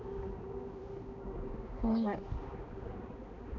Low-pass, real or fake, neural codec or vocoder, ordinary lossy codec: 7.2 kHz; fake; codec, 16 kHz, 2 kbps, X-Codec, HuBERT features, trained on balanced general audio; none